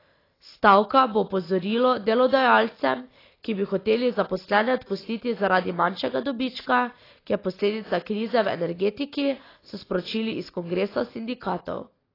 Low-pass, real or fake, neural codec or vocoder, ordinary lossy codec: 5.4 kHz; real; none; AAC, 24 kbps